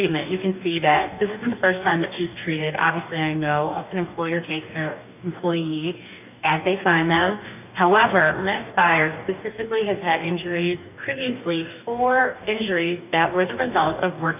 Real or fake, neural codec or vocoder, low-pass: fake; codec, 44.1 kHz, 2.6 kbps, DAC; 3.6 kHz